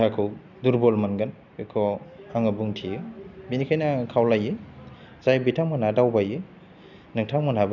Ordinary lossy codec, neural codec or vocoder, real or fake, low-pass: none; none; real; 7.2 kHz